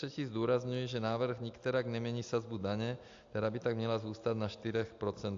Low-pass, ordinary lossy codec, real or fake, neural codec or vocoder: 7.2 kHz; AAC, 64 kbps; real; none